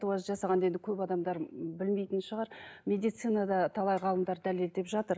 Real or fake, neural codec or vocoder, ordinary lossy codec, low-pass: real; none; none; none